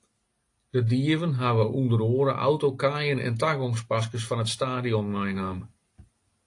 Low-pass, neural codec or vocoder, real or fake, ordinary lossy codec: 10.8 kHz; none; real; AAC, 48 kbps